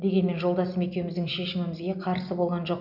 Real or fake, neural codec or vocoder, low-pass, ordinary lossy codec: real; none; 5.4 kHz; none